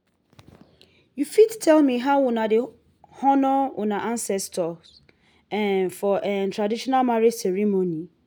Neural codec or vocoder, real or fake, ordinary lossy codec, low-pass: none; real; none; none